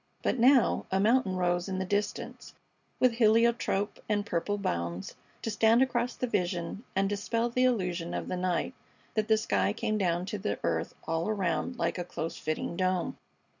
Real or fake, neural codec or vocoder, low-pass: real; none; 7.2 kHz